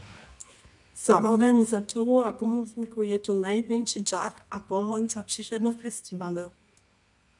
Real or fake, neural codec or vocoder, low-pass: fake; codec, 24 kHz, 0.9 kbps, WavTokenizer, medium music audio release; 10.8 kHz